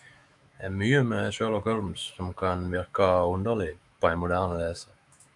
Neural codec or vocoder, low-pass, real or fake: autoencoder, 48 kHz, 128 numbers a frame, DAC-VAE, trained on Japanese speech; 10.8 kHz; fake